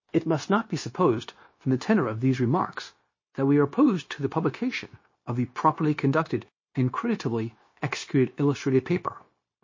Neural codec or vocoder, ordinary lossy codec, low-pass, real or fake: codec, 16 kHz, 0.9 kbps, LongCat-Audio-Codec; MP3, 32 kbps; 7.2 kHz; fake